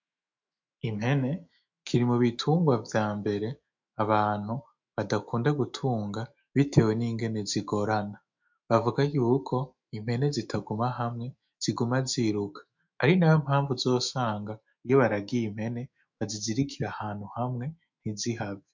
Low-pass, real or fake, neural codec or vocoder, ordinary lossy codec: 7.2 kHz; fake; autoencoder, 48 kHz, 128 numbers a frame, DAC-VAE, trained on Japanese speech; MP3, 64 kbps